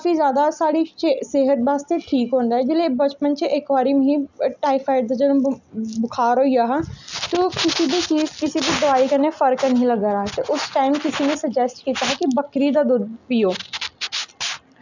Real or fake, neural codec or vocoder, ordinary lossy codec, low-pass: real; none; none; 7.2 kHz